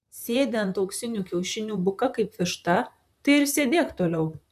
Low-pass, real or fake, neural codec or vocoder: 14.4 kHz; fake; vocoder, 44.1 kHz, 128 mel bands, Pupu-Vocoder